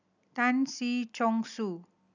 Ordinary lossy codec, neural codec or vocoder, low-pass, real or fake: none; none; 7.2 kHz; real